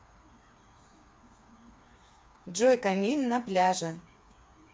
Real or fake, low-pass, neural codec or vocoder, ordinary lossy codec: fake; none; codec, 16 kHz, 4 kbps, FreqCodec, smaller model; none